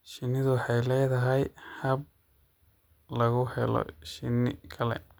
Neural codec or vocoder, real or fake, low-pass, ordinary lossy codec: none; real; none; none